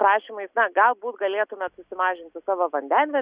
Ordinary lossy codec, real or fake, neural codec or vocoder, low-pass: Opus, 64 kbps; real; none; 3.6 kHz